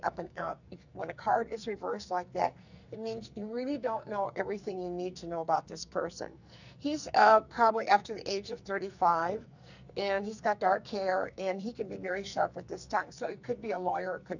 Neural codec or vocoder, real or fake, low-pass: codec, 44.1 kHz, 2.6 kbps, SNAC; fake; 7.2 kHz